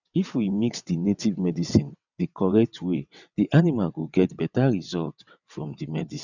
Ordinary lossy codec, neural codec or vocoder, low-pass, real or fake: none; vocoder, 22.05 kHz, 80 mel bands, WaveNeXt; 7.2 kHz; fake